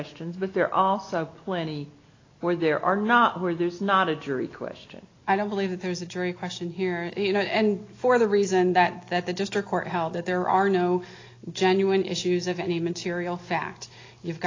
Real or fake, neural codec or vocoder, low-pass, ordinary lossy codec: real; none; 7.2 kHz; AAC, 32 kbps